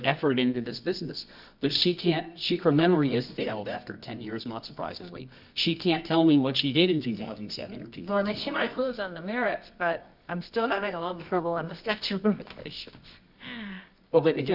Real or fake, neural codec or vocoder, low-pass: fake; codec, 24 kHz, 0.9 kbps, WavTokenizer, medium music audio release; 5.4 kHz